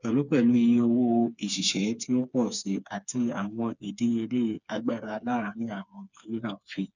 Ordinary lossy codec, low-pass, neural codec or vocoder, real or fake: none; 7.2 kHz; codec, 16 kHz, 4 kbps, FreqCodec, smaller model; fake